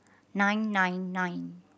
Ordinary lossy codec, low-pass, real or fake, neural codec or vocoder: none; none; real; none